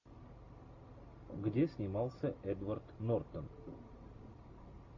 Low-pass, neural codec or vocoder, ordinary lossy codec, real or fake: 7.2 kHz; none; AAC, 48 kbps; real